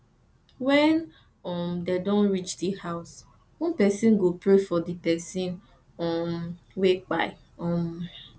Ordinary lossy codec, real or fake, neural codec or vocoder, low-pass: none; real; none; none